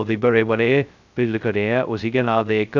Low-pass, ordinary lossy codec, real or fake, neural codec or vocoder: 7.2 kHz; none; fake; codec, 16 kHz, 0.2 kbps, FocalCodec